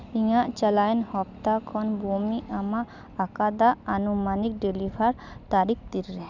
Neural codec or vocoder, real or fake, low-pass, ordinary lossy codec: none; real; 7.2 kHz; none